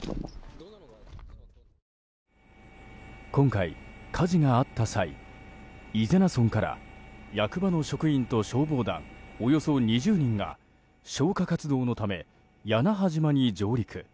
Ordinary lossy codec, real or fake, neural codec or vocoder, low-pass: none; real; none; none